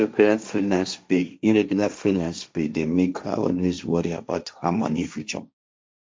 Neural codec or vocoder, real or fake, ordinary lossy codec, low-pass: codec, 16 kHz, 1.1 kbps, Voila-Tokenizer; fake; none; none